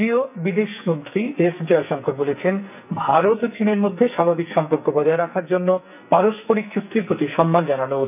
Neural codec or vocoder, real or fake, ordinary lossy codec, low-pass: codec, 44.1 kHz, 2.6 kbps, SNAC; fake; none; 3.6 kHz